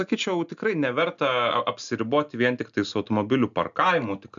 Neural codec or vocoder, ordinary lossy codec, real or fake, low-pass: none; MP3, 96 kbps; real; 7.2 kHz